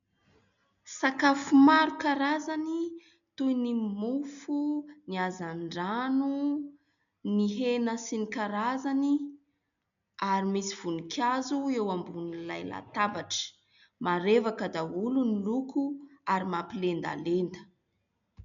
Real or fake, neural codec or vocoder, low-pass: real; none; 7.2 kHz